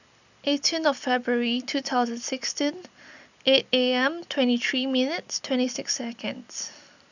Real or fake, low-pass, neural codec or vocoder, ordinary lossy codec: real; 7.2 kHz; none; none